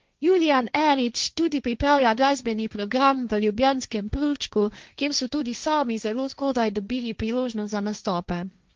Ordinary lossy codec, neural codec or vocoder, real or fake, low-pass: Opus, 24 kbps; codec, 16 kHz, 1.1 kbps, Voila-Tokenizer; fake; 7.2 kHz